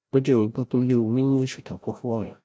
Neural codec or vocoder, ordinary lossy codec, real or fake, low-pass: codec, 16 kHz, 0.5 kbps, FreqCodec, larger model; none; fake; none